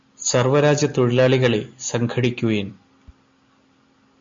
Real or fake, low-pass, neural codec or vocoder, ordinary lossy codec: real; 7.2 kHz; none; MP3, 48 kbps